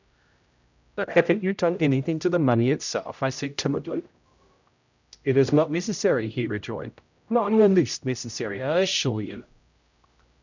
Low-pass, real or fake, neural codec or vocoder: 7.2 kHz; fake; codec, 16 kHz, 0.5 kbps, X-Codec, HuBERT features, trained on general audio